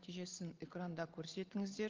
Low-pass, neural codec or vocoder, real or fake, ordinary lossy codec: 7.2 kHz; none; real; Opus, 16 kbps